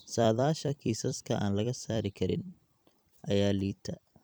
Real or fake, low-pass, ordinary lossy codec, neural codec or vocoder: fake; none; none; vocoder, 44.1 kHz, 128 mel bands every 256 samples, BigVGAN v2